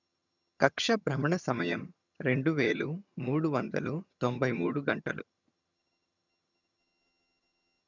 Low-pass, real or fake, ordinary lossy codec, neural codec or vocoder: 7.2 kHz; fake; none; vocoder, 22.05 kHz, 80 mel bands, HiFi-GAN